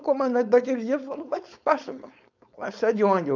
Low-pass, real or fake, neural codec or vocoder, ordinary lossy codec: 7.2 kHz; fake; codec, 16 kHz, 4.8 kbps, FACodec; none